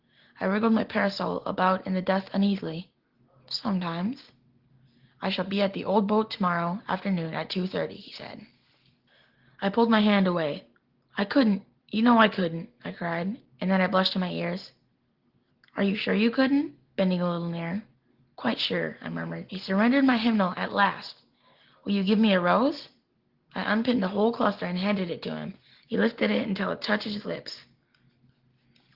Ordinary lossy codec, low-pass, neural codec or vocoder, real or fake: Opus, 16 kbps; 5.4 kHz; none; real